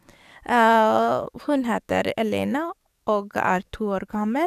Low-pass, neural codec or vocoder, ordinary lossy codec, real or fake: 14.4 kHz; codec, 44.1 kHz, 7.8 kbps, DAC; none; fake